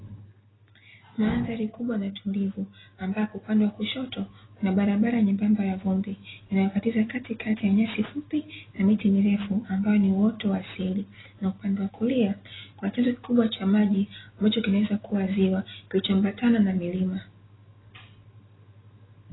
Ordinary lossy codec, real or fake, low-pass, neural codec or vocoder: AAC, 16 kbps; real; 7.2 kHz; none